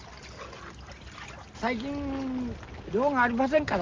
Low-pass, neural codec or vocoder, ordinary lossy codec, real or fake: 7.2 kHz; none; Opus, 32 kbps; real